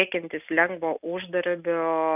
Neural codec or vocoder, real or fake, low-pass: none; real; 3.6 kHz